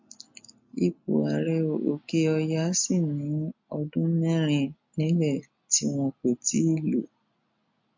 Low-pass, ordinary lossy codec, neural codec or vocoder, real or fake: 7.2 kHz; MP3, 48 kbps; none; real